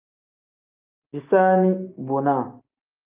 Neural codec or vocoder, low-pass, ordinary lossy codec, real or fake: none; 3.6 kHz; Opus, 24 kbps; real